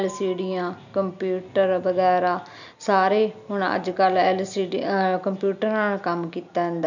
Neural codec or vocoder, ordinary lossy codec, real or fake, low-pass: none; none; real; 7.2 kHz